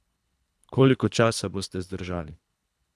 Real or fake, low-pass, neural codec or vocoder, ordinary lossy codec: fake; none; codec, 24 kHz, 3 kbps, HILCodec; none